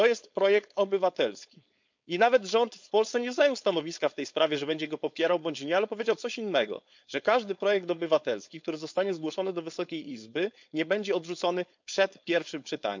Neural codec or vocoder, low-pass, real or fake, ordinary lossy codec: codec, 16 kHz, 4.8 kbps, FACodec; 7.2 kHz; fake; MP3, 64 kbps